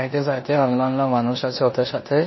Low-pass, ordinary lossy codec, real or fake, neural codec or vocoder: 7.2 kHz; MP3, 24 kbps; fake; codec, 16 kHz in and 24 kHz out, 0.9 kbps, LongCat-Audio-Codec, four codebook decoder